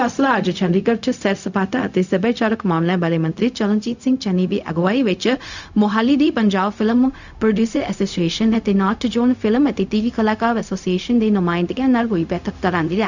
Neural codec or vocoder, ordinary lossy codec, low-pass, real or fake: codec, 16 kHz, 0.4 kbps, LongCat-Audio-Codec; none; 7.2 kHz; fake